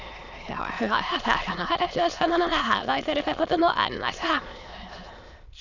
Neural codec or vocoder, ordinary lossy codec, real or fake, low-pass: autoencoder, 22.05 kHz, a latent of 192 numbers a frame, VITS, trained on many speakers; none; fake; 7.2 kHz